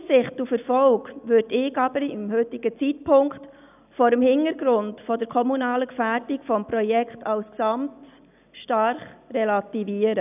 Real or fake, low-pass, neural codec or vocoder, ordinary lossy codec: real; 3.6 kHz; none; none